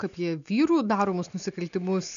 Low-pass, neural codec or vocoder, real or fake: 7.2 kHz; none; real